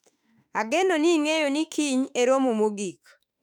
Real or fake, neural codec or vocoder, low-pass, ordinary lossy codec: fake; autoencoder, 48 kHz, 32 numbers a frame, DAC-VAE, trained on Japanese speech; 19.8 kHz; none